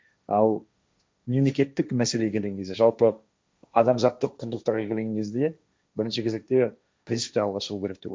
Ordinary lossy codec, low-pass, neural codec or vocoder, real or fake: none; none; codec, 16 kHz, 1.1 kbps, Voila-Tokenizer; fake